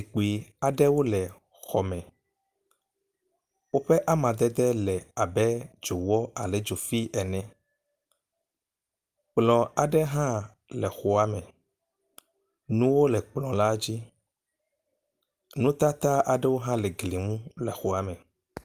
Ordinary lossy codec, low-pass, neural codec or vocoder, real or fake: Opus, 32 kbps; 14.4 kHz; none; real